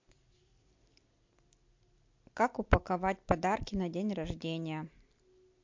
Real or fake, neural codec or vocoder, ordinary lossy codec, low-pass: real; none; MP3, 48 kbps; 7.2 kHz